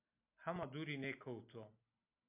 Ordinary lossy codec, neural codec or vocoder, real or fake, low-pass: MP3, 32 kbps; none; real; 3.6 kHz